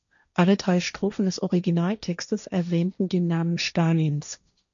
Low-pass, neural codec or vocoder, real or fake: 7.2 kHz; codec, 16 kHz, 1.1 kbps, Voila-Tokenizer; fake